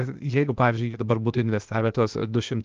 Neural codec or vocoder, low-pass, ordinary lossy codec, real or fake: codec, 16 kHz, 0.8 kbps, ZipCodec; 7.2 kHz; Opus, 32 kbps; fake